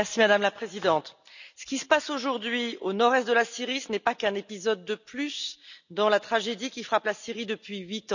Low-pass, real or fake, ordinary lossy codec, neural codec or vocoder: 7.2 kHz; real; none; none